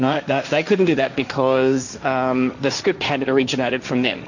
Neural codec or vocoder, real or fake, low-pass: codec, 16 kHz, 1.1 kbps, Voila-Tokenizer; fake; 7.2 kHz